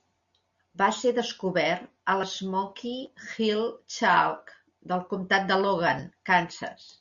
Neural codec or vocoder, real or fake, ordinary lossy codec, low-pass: none; real; Opus, 64 kbps; 7.2 kHz